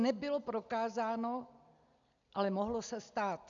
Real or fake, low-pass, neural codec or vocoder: real; 7.2 kHz; none